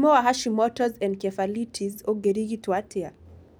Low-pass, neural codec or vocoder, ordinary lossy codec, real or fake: none; none; none; real